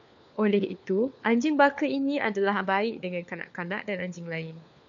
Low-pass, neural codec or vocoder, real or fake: 7.2 kHz; codec, 16 kHz, 4 kbps, FunCodec, trained on LibriTTS, 50 frames a second; fake